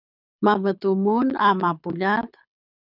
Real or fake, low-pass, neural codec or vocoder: fake; 5.4 kHz; codec, 24 kHz, 6 kbps, HILCodec